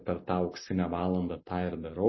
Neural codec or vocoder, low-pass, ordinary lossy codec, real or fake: none; 7.2 kHz; MP3, 24 kbps; real